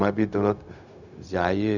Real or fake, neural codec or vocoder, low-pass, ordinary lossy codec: fake; codec, 16 kHz, 0.4 kbps, LongCat-Audio-Codec; 7.2 kHz; none